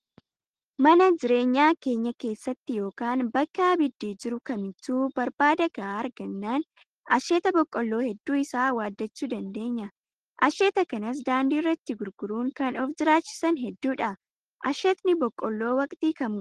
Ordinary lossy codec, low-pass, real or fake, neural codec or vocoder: Opus, 16 kbps; 9.9 kHz; real; none